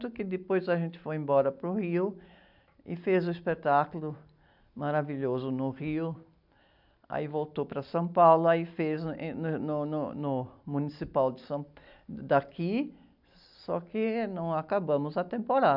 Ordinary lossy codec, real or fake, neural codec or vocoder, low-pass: none; real; none; 5.4 kHz